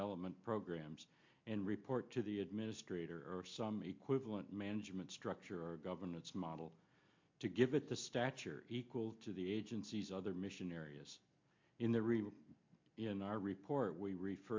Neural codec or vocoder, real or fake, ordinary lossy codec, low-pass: none; real; MP3, 48 kbps; 7.2 kHz